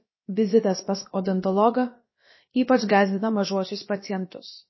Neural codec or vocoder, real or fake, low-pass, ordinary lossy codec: codec, 16 kHz, about 1 kbps, DyCAST, with the encoder's durations; fake; 7.2 kHz; MP3, 24 kbps